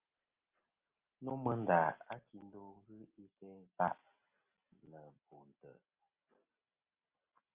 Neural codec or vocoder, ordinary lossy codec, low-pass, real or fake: none; Opus, 32 kbps; 3.6 kHz; real